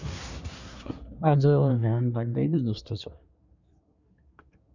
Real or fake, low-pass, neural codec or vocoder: fake; 7.2 kHz; codec, 24 kHz, 1 kbps, SNAC